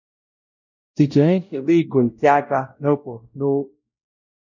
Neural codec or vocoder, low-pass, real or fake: codec, 16 kHz, 0.5 kbps, X-Codec, WavLM features, trained on Multilingual LibriSpeech; 7.2 kHz; fake